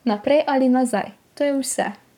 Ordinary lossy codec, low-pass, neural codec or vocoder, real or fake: none; 19.8 kHz; codec, 44.1 kHz, 7.8 kbps, Pupu-Codec; fake